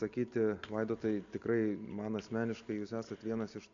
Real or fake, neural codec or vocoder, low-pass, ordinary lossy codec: real; none; 7.2 kHz; AAC, 64 kbps